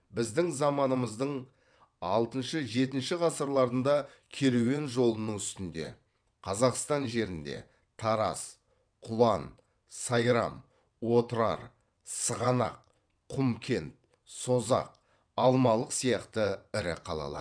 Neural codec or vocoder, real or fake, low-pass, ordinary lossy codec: vocoder, 22.05 kHz, 80 mel bands, WaveNeXt; fake; 9.9 kHz; none